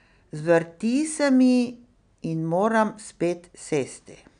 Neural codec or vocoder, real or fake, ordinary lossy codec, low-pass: none; real; none; 9.9 kHz